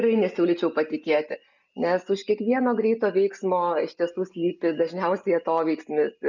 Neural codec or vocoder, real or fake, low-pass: none; real; 7.2 kHz